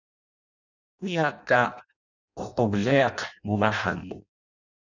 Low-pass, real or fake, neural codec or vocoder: 7.2 kHz; fake; codec, 16 kHz in and 24 kHz out, 0.6 kbps, FireRedTTS-2 codec